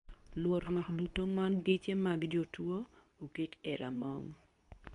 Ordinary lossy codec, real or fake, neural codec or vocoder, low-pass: none; fake; codec, 24 kHz, 0.9 kbps, WavTokenizer, medium speech release version 2; 10.8 kHz